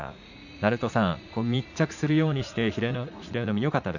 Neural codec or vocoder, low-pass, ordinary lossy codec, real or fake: vocoder, 44.1 kHz, 80 mel bands, Vocos; 7.2 kHz; none; fake